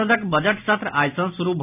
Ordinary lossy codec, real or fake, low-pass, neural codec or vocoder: none; real; 3.6 kHz; none